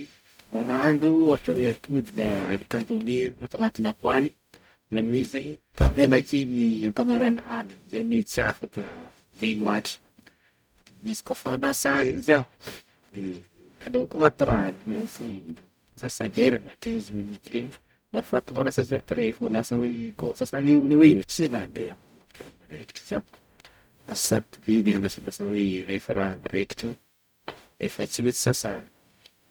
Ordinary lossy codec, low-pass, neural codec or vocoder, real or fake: none; none; codec, 44.1 kHz, 0.9 kbps, DAC; fake